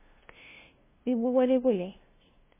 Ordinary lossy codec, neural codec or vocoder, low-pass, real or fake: MP3, 16 kbps; codec, 16 kHz, 0.5 kbps, FunCodec, trained on LibriTTS, 25 frames a second; 3.6 kHz; fake